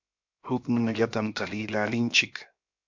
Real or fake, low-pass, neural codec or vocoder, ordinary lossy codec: fake; 7.2 kHz; codec, 16 kHz, 0.7 kbps, FocalCodec; MP3, 64 kbps